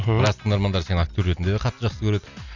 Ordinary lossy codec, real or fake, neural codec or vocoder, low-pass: none; real; none; 7.2 kHz